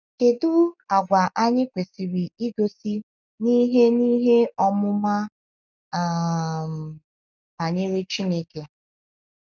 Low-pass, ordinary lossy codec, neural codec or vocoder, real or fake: 7.2 kHz; none; none; real